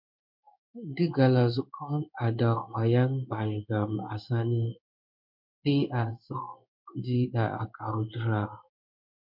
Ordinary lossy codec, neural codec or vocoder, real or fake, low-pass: MP3, 48 kbps; codec, 16 kHz in and 24 kHz out, 1 kbps, XY-Tokenizer; fake; 5.4 kHz